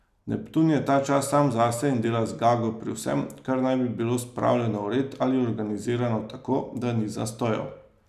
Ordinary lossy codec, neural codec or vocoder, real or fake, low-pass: none; none; real; 14.4 kHz